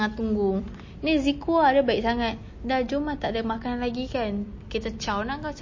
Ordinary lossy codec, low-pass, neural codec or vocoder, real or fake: MP3, 32 kbps; 7.2 kHz; none; real